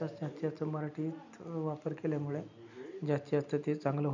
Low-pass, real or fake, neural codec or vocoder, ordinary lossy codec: 7.2 kHz; real; none; none